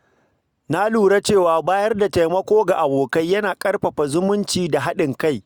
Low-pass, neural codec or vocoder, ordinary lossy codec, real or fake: 19.8 kHz; none; Opus, 64 kbps; real